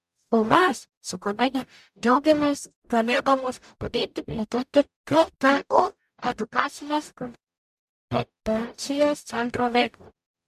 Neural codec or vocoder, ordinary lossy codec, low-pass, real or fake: codec, 44.1 kHz, 0.9 kbps, DAC; MP3, 96 kbps; 14.4 kHz; fake